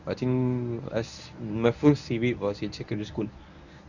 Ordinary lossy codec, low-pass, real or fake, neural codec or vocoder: none; 7.2 kHz; fake; codec, 24 kHz, 0.9 kbps, WavTokenizer, medium speech release version 1